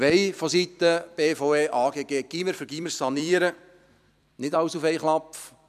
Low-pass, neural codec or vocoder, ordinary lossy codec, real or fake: 14.4 kHz; vocoder, 44.1 kHz, 128 mel bands every 256 samples, BigVGAN v2; none; fake